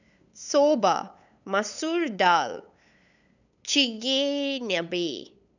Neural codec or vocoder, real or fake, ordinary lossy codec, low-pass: codec, 16 kHz, 8 kbps, FunCodec, trained on LibriTTS, 25 frames a second; fake; none; 7.2 kHz